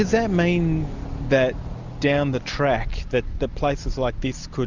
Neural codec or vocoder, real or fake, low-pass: none; real; 7.2 kHz